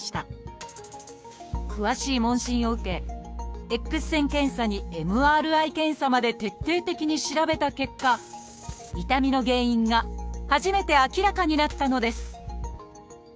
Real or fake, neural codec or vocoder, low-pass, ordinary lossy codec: fake; codec, 16 kHz, 6 kbps, DAC; none; none